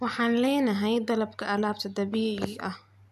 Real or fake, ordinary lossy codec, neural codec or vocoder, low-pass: real; none; none; 14.4 kHz